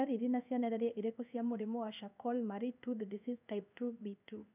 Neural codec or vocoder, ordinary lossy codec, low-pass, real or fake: codec, 16 kHz in and 24 kHz out, 1 kbps, XY-Tokenizer; none; 3.6 kHz; fake